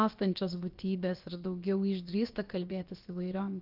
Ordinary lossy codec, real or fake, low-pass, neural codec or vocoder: Opus, 32 kbps; fake; 5.4 kHz; codec, 16 kHz, about 1 kbps, DyCAST, with the encoder's durations